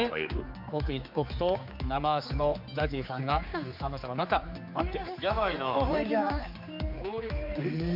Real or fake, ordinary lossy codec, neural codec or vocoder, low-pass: fake; MP3, 48 kbps; codec, 16 kHz, 4 kbps, X-Codec, HuBERT features, trained on general audio; 5.4 kHz